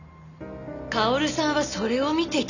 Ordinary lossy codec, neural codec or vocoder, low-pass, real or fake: none; none; 7.2 kHz; real